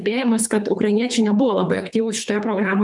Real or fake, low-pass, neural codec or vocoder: fake; 10.8 kHz; codec, 24 kHz, 3 kbps, HILCodec